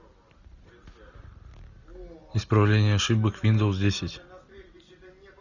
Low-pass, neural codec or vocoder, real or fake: 7.2 kHz; none; real